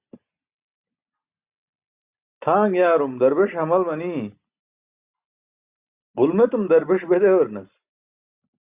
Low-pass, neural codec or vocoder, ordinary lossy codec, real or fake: 3.6 kHz; none; Opus, 64 kbps; real